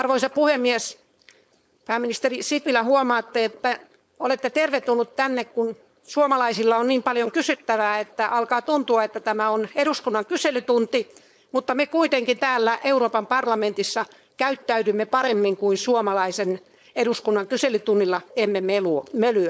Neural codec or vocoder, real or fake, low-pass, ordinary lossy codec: codec, 16 kHz, 4.8 kbps, FACodec; fake; none; none